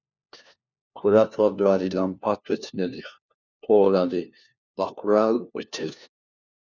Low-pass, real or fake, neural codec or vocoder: 7.2 kHz; fake; codec, 16 kHz, 1 kbps, FunCodec, trained on LibriTTS, 50 frames a second